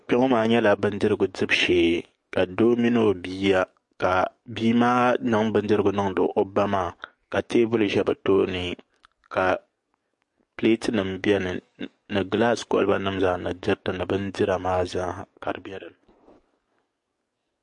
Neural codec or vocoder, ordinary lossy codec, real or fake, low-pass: codec, 44.1 kHz, 7.8 kbps, DAC; MP3, 48 kbps; fake; 10.8 kHz